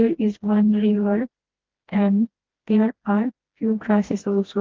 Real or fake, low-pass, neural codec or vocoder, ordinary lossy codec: fake; 7.2 kHz; codec, 16 kHz, 1 kbps, FreqCodec, smaller model; Opus, 16 kbps